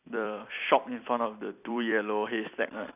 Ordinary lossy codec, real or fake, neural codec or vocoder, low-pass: none; fake; vocoder, 44.1 kHz, 128 mel bands every 256 samples, BigVGAN v2; 3.6 kHz